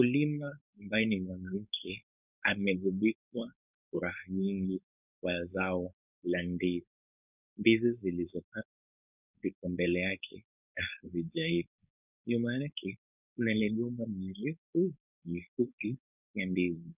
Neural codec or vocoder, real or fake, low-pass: codec, 16 kHz, 4.8 kbps, FACodec; fake; 3.6 kHz